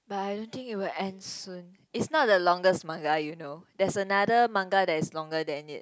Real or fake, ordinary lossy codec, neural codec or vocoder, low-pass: real; none; none; none